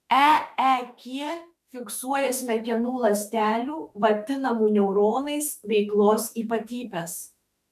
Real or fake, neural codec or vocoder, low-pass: fake; autoencoder, 48 kHz, 32 numbers a frame, DAC-VAE, trained on Japanese speech; 14.4 kHz